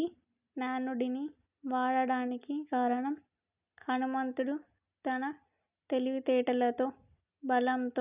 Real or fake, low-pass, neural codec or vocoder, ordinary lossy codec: real; 3.6 kHz; none; none